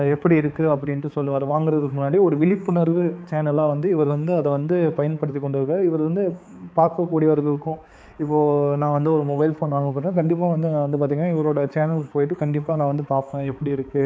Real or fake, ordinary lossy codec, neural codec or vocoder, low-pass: fake; none; codec, 16 kHz, 4 kbps, X-Codec, HuBERT features, trained on balanced general audio; none